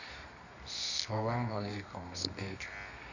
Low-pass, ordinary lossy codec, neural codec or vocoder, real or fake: 7.2 kHz; none; codec, 24 kHz, 0.9 kbps, WavTokenizer, medium music audio release; fake